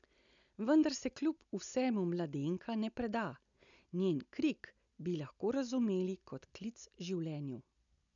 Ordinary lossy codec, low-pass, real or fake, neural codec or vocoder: none; 7.2 kHz; real; none